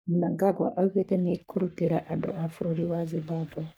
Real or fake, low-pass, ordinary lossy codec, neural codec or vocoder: fake; none; none; codec, 44.1 kHz, 3.4 kbps, Pupu-Codec